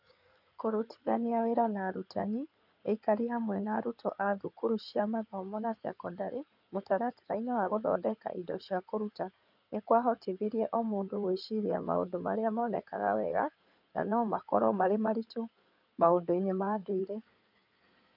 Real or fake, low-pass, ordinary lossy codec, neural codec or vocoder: fake; 5.4 kHz; none; codec, 16 kHz in and 24 kHz out, 2.2 kbps, FireRedTTS-2 codec